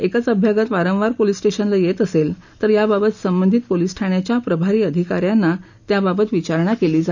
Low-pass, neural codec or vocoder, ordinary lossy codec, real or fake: 7.2 kHz; none; none; real